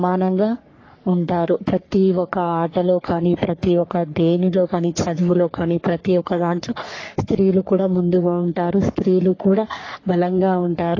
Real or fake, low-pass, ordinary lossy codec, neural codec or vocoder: fake; 7.2 kHz; AAC, 32 kbps; codec, 44.1 kHz, 3.4 kbps, Pupu-Codec